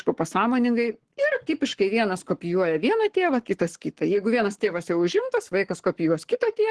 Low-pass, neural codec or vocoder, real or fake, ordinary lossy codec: 10.8 kHz; codec, 44.1 kHz, 7.8 kbps, Pupu-Codec; fake; Opus, 16 kbps